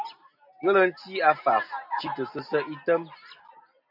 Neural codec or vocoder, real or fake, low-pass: none; real; 5.4 kHz